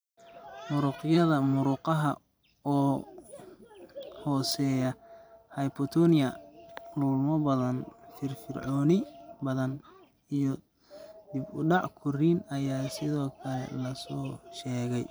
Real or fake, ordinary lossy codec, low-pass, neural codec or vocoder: fake; none; none; vocoder, 44.1 kHz, 128 mel bands every 512 samples, BigVGAN v2